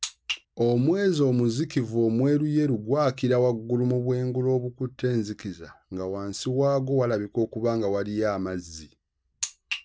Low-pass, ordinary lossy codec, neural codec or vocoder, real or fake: none; none; none; real